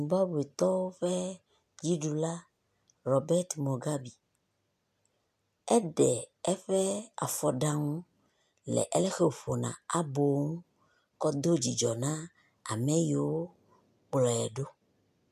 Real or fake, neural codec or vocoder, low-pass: real; none; 14.4 kHz